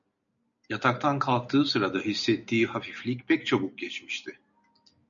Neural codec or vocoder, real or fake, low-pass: none; real; 7.2 kHz